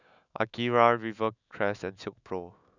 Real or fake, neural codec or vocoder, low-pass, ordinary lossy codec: fake; autoencoder, 48 kHz, 128 numbers a frame, DAC-VAE, trained on Japanese speech; 7.2 kHz; none